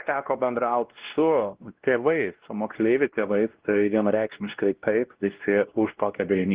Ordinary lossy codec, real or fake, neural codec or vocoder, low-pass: Opus, 16 kbps; fake; codec, 16 kHz, 1 kbps, X-Codec, WavLM features, trained on Multilingual LibriSpeech; 3.6 kHz